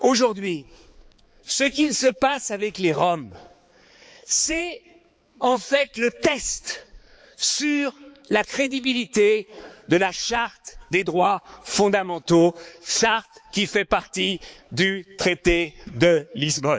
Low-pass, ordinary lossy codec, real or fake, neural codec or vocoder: none; none; fake; codec, 16 kHz, 4 kbps, X-Codec, HuBERT features, trained on balanced general audio